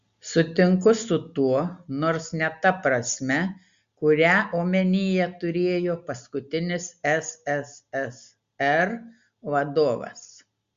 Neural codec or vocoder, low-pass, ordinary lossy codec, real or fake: none; 7.2 kHz; Opus, 64 kbps; real